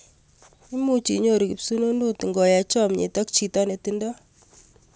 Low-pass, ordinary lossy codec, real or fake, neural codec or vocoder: none; none; real; none